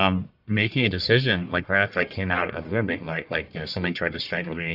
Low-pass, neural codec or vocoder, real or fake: 5.4 kHz; codec, 44.1 kHz, 1.7 kbps, Pupu-Codec; fake